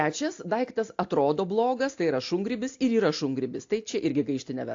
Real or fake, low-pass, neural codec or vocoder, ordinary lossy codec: real; 7.2 kHz; none; AAC, 48 kbps